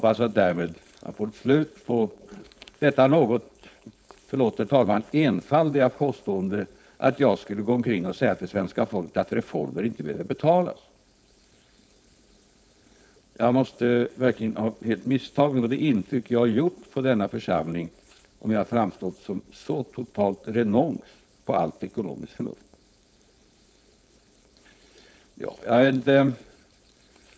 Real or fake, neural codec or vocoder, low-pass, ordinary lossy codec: fake; codec, 16 kHz, 4.8 kbps, FACodec; none; none